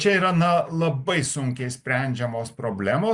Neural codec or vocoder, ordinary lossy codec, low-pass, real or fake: none; Opus, 64 kbps; 10.8 kHz; real